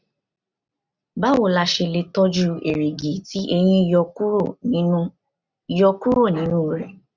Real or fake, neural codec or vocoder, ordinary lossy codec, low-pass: real; none; none; 7.2 kHz